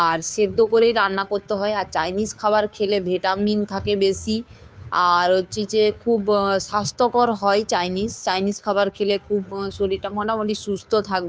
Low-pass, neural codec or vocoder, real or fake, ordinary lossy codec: none; codec, 16 kHz, 2 kbps, FunCodec, trained on Chinese and English, 25 frames a second; fake; none